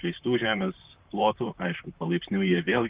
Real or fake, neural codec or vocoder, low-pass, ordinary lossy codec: fake; vocoder, 44.1 kHz, 128 mel bands, Pupu-Vocoder; 3.6 kHz; Opus, 32 kbps